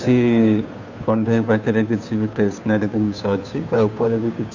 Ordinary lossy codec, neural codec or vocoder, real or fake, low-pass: none; codec, 16 kHz, 2 kbps, FunCodec, trained on Chinese and English, 25 frames a second; fake; 7.2 kHz